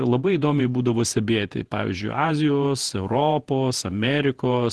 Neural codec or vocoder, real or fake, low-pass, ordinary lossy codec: vocoder, 48 kHz, 128 mel bands, Vocos; fake; 10.8 kHz; Opus, 16 kbps